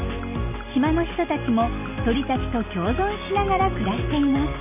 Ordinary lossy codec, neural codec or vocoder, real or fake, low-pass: none; none; real; 3.6 kHz